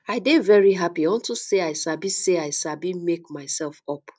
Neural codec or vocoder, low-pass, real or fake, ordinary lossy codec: none; none; real; none